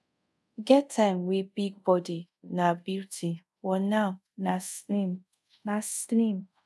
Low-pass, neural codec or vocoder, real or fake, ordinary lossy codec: none; codec, 24 kHz, 0.5 kbps, DualCodec; fake; none